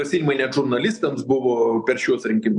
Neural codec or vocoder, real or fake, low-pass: none; real; 10.8 kHz